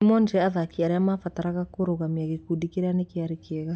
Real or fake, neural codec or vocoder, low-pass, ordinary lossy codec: real; none; none; none